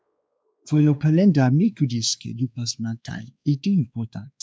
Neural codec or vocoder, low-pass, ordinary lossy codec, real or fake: codec, 16 kHz, 2 kbps, X-Codec, WavLM features, trained on Multilingual LibriSpeech; none; none; fake